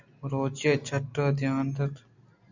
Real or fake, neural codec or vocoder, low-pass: real; none; 7.2 kHz